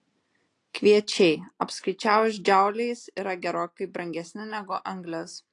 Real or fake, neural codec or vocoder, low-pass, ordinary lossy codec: real; none; 10.8 kHz; AAC, 48 kbps